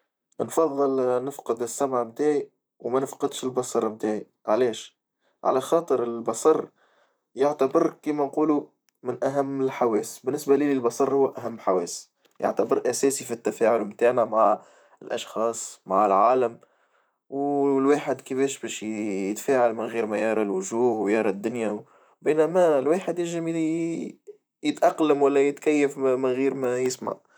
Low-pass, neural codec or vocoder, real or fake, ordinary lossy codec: none; vocoder, 44.1 kHz, 128 mel bands, Pupu-Vocoder; fake; none